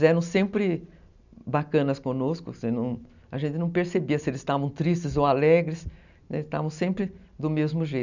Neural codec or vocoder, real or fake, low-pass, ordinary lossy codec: none; real; 7.2 kHz; none